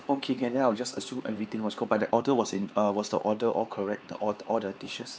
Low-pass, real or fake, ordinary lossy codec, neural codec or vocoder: none; fake; none; codec, 16 kHz, 4 kbps, X-Codec, HuBERT features, trained on LibriSpeech